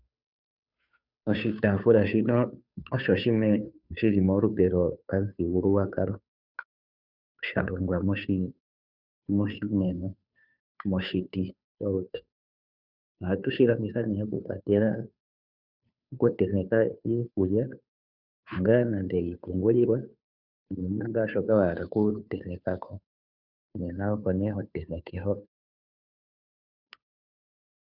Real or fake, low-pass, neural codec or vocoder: fake; 5.4 kHz; codec, 16 kHz, 2 kbps, FunCodec, trained on Chinese and English, 25 frames a second